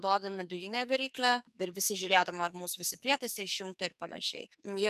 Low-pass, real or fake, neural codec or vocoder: 14.4 kHz; fake; codec, 44.1 kHz, 2.6 kbps, SNAC